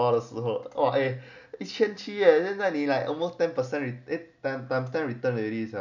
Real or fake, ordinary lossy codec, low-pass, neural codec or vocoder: real; none; 7.2 kHz; none